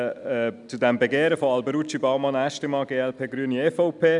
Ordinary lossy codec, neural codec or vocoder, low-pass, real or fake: none; none; 10.8 kHz; real